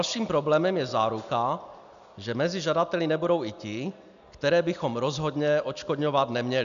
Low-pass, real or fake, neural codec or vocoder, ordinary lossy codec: 7.2 kHz; real; none; MP3, 96 kbps